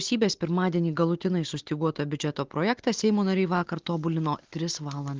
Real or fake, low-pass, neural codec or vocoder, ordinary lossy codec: real; 7.2 kHz; none; Opus, 16 kbps